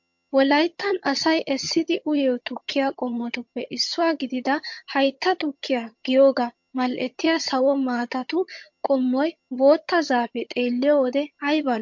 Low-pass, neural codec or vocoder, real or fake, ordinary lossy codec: 7.2 kHz; vocoder, 22.05 kHz, 80 mel bands, HiFi-GAN; fake; MP3, 48 kbps